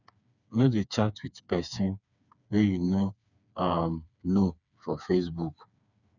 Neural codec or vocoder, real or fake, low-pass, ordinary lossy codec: codec, 16 kHz, 4 kbps, FreqCodec, smaller model; fake; 7.2 kHz; none